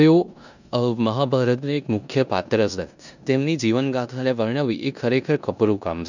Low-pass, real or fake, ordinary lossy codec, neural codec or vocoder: 7.2 kHz; fake; none; codec, 16 kHz in and 24 kHz out, 0.9 kbps, LongCat-Audio-Codec, four codebook decoder